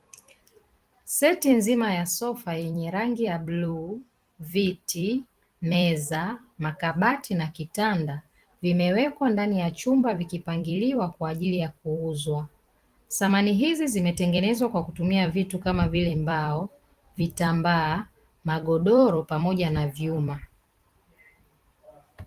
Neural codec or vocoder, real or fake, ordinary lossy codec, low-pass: vocoder, 44.1 kHz, 128 mel bands every 256 samples, BigVGAN v2; fake; Opus, 24 kbps; 14.4 kHz